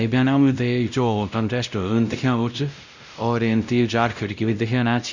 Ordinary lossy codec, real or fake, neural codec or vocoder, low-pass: none; fake; codec, 16 kHz, 0.5 kbps, X-Codec, WavLM features, trained on Multilingual LibriSpeech; 7.2 kHz